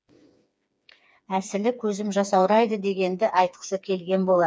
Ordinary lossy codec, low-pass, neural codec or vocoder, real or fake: none; none; codec, 16 kHz, 4 kbps, FreqCodec, smaller model; fake